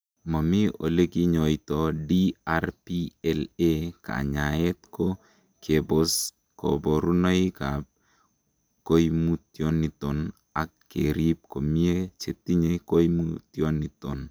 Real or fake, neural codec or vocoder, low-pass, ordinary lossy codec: real; none; none; none